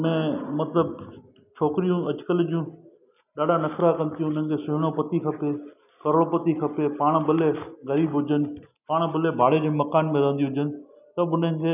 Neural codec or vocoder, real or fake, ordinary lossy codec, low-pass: none; real; none; 3.6 kHz